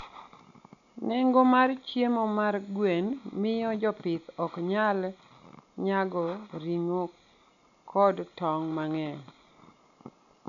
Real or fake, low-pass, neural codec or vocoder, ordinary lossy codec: real; 7.2 kHz; none; none